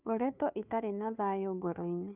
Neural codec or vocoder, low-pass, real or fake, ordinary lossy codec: codec, 16 kHz, 2 kbps, FunCodec, trained on Chinese and English, 25 frames a second; 3.6 kHz; fake; Opus, 64 kbps